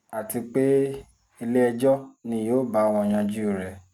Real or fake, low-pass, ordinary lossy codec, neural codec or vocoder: real; 19.8 kHz; Opus, 64 kbps; none